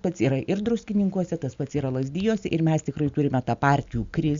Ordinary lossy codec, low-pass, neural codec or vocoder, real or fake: Opus, 64 kbps; 7.2 kHz; none; real